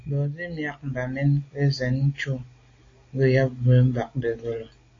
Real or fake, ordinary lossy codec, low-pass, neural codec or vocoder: real; AAC, 32 kbps; 7.2 kHz; none